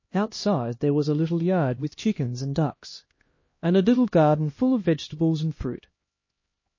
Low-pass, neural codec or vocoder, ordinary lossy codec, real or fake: 7.2 kHz; codec, 16 kHz, 1 kbps, X-Codec, HuBERT features, trained on LibriSpeech; MP3, 32 kbps; fake